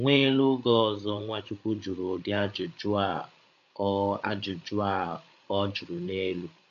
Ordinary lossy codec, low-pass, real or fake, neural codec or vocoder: none; 7.2 kHz; fake; codec, 16 kHz, 8 kbps, FreqCodec, larger model